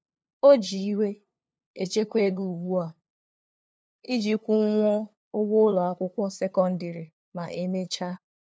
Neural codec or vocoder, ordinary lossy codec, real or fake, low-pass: codec, 16 kHz, 2 kbps, FunCodec, trained on LibriTTS, 25 frames a second; none; fake; none